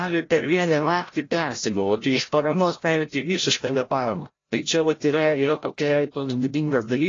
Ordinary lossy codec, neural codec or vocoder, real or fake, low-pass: AAC, 32 kbps; codec, 16 kHz, 0.5 kbps, FreqCodec, larger model; fake; 7.2 kHz